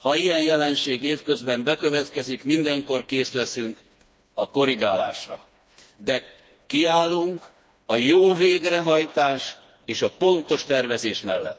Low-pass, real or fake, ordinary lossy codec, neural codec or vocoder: none; fake; none; codec, 16 kHz, 2 kbps, FreqCodec, smaller model